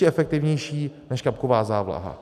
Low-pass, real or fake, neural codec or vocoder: 14.4 kHz; real; none